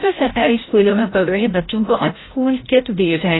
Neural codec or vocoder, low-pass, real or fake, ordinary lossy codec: codec, 16 kHz, 0.5 kbps, FreqCodec, larger model; 7.2 kHz; fake; AAC, 16 kbps